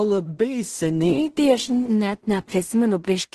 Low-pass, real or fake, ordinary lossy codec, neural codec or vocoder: 10.8 kHz; fake; Opus, 16 kbps; codec, 16 kHz in and 24 kHz out, 0.4 kbps, LongCat-Audio-Codec, two codebook decoder